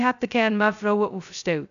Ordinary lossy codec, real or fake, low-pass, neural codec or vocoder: none; fake; 7.2 kHz; codec, 16 kHz, 0.2 kbps, FocalCodec